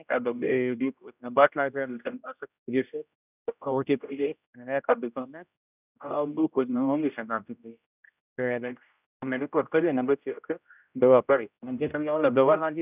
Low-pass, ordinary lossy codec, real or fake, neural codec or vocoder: 3.6 kHz; none; fake; codec, 16 kHz, 0.5 kbps, X-Codec, HuBERT features, trained on general audio